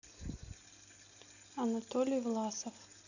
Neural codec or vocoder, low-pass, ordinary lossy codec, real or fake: none; 7.2 kHz; none; real